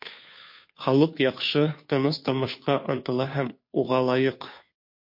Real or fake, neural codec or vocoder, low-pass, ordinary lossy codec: fake; codec, 16 kHz, 2 kbps, FunCodec, trained on Chinese and English, 25 frames a second; 5.4 kHz; MP3, 32 kbps